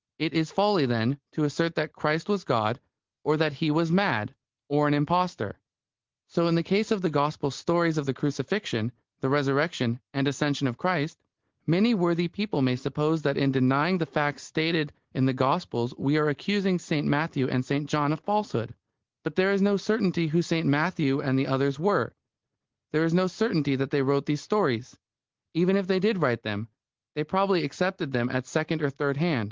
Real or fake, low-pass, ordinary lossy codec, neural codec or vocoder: real; 7.2 kHz; Opus, 16 kbps; none